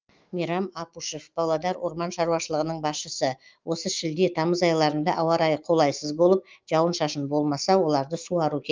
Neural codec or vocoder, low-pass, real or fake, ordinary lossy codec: autoencoder, 48 kHz, 128 numbers a frame, DAC-VAE, trained on Japanese speech; 7.2 kHz; fake; Opus, 32 kbps